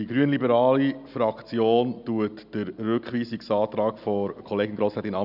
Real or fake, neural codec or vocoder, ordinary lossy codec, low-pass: real; none; none; 5.4 kHz